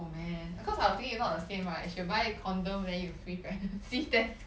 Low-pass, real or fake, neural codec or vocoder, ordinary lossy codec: none; real; none; none